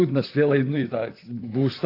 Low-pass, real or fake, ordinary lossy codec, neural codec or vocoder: 5.4 kHz; fake; AAC, 32 kbps; vocoder, 44.1 kHz, 128 mel bands, Pupu-Vocoder